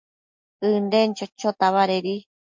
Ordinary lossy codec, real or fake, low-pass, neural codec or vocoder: MP3, 48 kbps; real; 7.2 kHz; none